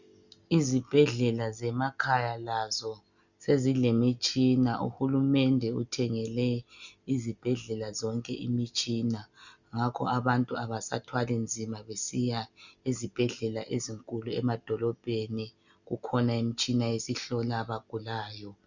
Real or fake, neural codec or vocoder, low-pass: real; none; 7.2 kHz